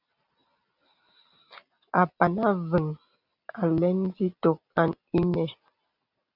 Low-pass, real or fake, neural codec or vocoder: 5.4 kHz; real; none